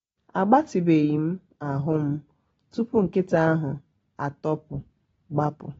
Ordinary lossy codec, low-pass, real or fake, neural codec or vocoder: AAC, 24 kbps; 19.8 kHz; real; none